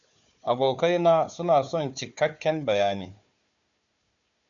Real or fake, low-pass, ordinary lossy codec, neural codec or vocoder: fake; 7.2 kHz; MP3, 96 kbps; codec, 16 kHz, 4 kbps, FunCodec, trained on Chinese and English, 50 frames a second